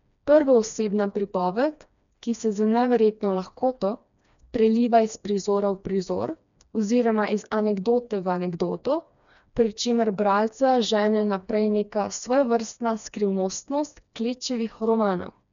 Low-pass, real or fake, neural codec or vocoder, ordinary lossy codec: 7.2 kHz; fake; codec, 16 kHz, 2 kbps, FreqCodec, smaller model; MP3, 96 kbps